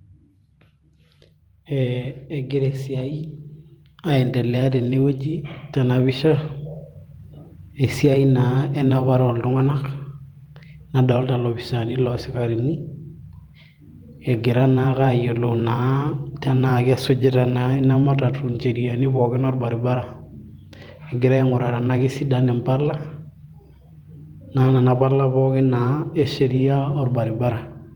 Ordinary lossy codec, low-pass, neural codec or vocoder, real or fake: Opus, 32 kbps; 19.8 kHz; vocoder, 44.1 kHz, 128 mel bands every 512 samples, BigVGAN v2; fake